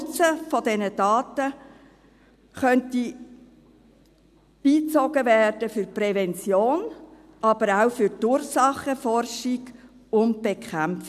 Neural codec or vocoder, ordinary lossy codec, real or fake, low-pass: none; none; real; 14.4 kHz